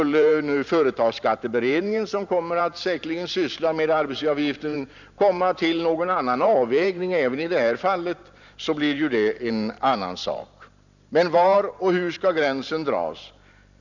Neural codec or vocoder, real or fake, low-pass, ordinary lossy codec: vocoder, 44.1 kHz, 128 mel bands every 512 samples, BigVGAN v2; fake; 7.2 kHz; none